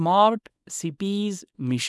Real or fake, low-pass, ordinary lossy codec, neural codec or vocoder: fake; none; none; codec, 24 kHz, 0.9 kbps, WavTokenizer, small release